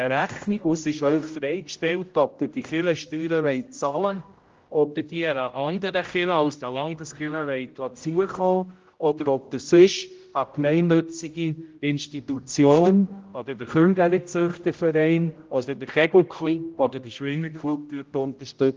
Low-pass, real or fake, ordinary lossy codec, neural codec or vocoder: 7.2 kHz; fake; Opus, 32 kbps; codec, 16 kHz, 0.5 kbps, X-Codec, HuBERT features, trained on general audio